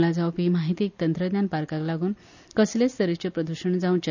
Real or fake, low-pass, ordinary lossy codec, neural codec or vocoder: real; 7.2 kHz; none; none